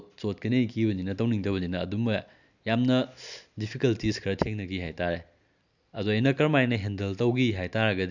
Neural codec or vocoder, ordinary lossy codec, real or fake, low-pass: none; none; real; 7.2 kHz